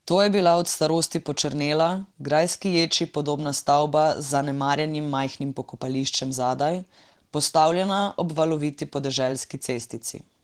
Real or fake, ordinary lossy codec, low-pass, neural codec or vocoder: fake; Opus, 16 kbps; 19.8 kHz; vocoder, 44.1 kHz, 128 mel bands every 512 samples, BigVGAN v2